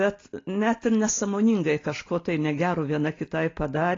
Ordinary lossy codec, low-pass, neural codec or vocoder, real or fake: AAC, 32 kbps; 7.2 kHz; none; real